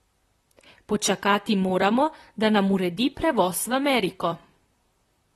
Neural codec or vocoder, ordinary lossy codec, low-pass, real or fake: vocoder, 44.1 kHz, 128 mel bands, Pupu-Vocoder; AAC, 32 kbps; 19.8 kHz; fake